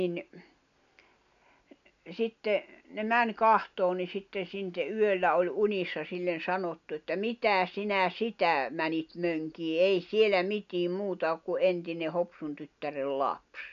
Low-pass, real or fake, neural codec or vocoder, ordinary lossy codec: 7.2 kHz; real; none; none